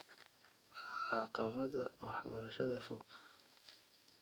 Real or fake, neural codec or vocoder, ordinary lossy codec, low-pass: fake; codec, 44.1 kHz, 2.6 kbps, DAC; none; none